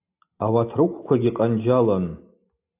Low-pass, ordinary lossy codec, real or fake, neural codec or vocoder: 3.6 kHz; AAC, 32 kbps; real; none